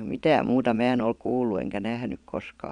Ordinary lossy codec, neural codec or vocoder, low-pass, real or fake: none; vocoder, 22.05 kHz, 80 mel bands, Vocos; 9.9 kHz; fake